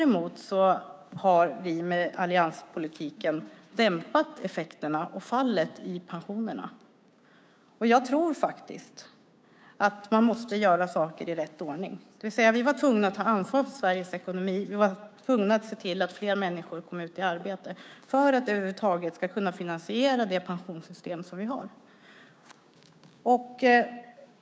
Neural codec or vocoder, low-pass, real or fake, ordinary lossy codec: codec, 16 kHz, 6 kbps, DAC; none; fake; none